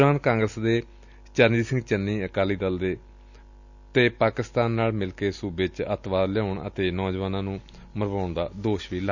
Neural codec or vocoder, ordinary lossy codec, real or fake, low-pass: none; none; real; 7.2 kHz